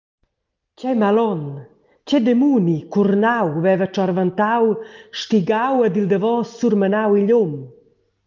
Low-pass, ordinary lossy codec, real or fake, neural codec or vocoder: 7.2 kHz; Opus, 32 kbps; real; none